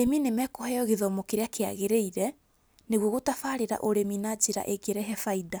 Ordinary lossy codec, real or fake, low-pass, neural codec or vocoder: none; real; none; none